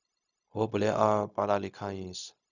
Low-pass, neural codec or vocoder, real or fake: 7.2 kHz; codec, 16 kHz, 0.4 kbps, LongCat-Audio-Codec; fake